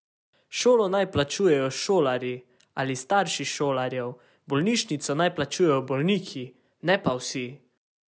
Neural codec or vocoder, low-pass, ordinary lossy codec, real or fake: none; none; none; real